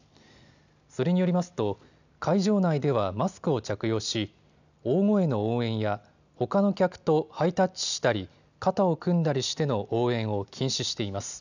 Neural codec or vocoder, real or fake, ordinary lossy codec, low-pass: none; real; none; 7.2 kHz